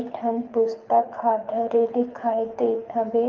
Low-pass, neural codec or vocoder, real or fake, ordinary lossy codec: 7.2 kHz; codec, 24 kHz, 6 kbps, HILCodec; fake; Opus, 24 kbps